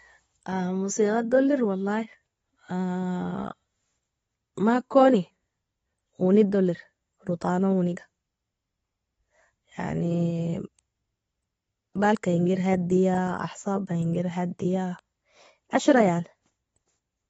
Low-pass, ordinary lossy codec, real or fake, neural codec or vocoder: 19.8 kHz; AAC, 24 kbps; real; none